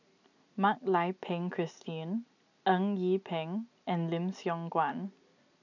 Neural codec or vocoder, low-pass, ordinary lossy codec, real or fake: none; 7.2 kHz; none; real